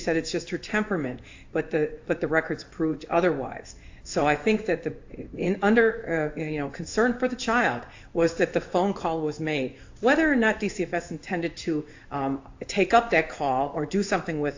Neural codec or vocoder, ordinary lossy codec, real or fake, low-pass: codec, 16 kHz in and 24 kHz out, 1 kbps, XY-Tokenizer; AAC, 48 kbps; fake; 7.2 kHz